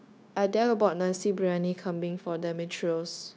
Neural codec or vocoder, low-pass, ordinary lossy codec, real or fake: codec, 16 kHz, 0.9 kbps, LongCat-Audio-Codec; none; none; fake